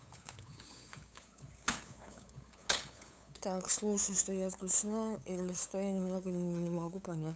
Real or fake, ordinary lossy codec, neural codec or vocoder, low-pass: fake; none; codec, 16 kHz, 8 kbps, FunCodec, trained on LibriTTS, 25 frames a second; none